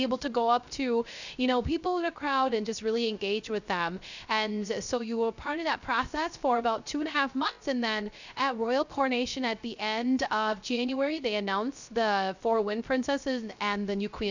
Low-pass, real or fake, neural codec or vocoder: 7.2 kHz; fake; codec, 16 kHz, 0.3 kbps, FocalCodec